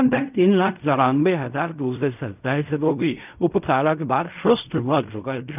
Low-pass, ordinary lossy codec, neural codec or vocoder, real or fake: 3.6 kHz; none; codec, 16 kHz in and 24 kHz out, 0.4 kbps, LongCat-Audio-Codec, fine tuned four codebook decoder; fake